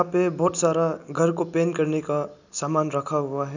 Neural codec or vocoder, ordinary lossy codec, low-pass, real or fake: none; none; 7.2 kHz; real